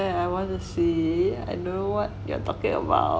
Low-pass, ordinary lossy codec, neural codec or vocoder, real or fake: none; none; none; real